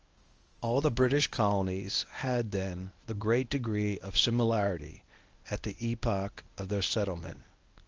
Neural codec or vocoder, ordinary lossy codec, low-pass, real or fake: codec, 24 kHz, 0.9 kbps, WavTokenizer, medium speech release version 1; Opus, 24 kbps; 7.2 kHz; fake